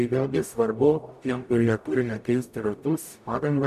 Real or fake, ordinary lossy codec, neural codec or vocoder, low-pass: fake; AAC, 96 kbps; codec, 44.1 kHz, 0.9 kbps, DAC; 14.4 kHz